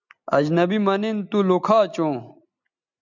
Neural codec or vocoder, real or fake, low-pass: none; real; 7.2 kHz